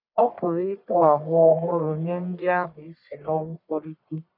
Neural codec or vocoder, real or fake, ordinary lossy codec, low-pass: codec, 44.1 kHz, 1.7 kbps, Pupu-Codec; fake; none; 5.4 kHz